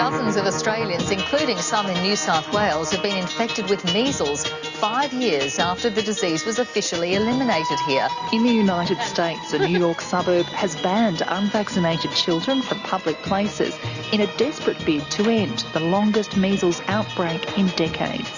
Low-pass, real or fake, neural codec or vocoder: 7.2 kHz; real; none